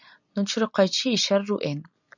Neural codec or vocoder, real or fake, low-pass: none; real; 7.2 kHz